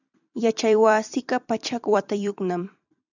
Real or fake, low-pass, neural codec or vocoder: fake; 7.2 kHz; vocoder, 44.1 kHz, 128 mel bands every 256 samples, BigVGAN v2